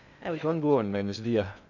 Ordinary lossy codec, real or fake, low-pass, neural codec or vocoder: none; fake; 7.2 kHz; codec, 16 kHz in and 24 kHz out, 0.6 kbps, FocalCodec, streaming, 2048 codes